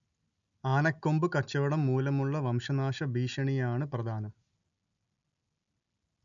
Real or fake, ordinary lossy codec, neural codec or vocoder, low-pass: real; none; none; 7.2 kHz